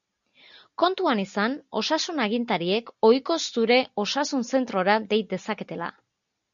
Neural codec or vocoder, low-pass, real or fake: none; 7.2 kHz; real